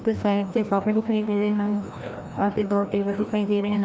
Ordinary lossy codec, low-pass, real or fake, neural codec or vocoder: none; none; fake; codec, 16 kHz, 1 kbps, FreqCodec, larger model